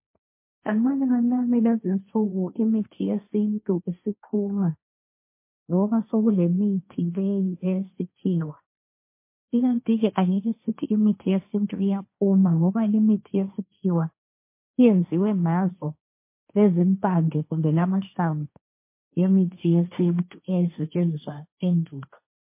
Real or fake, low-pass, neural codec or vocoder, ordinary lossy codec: fake; 3.6 kHz; codec, 16 kHz, 1.1 kbps, Voila-Tokenizer; MP3, 24 kbps